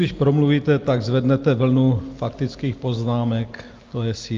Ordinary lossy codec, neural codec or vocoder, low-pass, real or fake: Opus, 24 kbps; none; 7.2 kHz; real